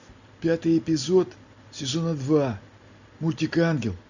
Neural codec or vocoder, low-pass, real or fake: none; 7.2 kHz; real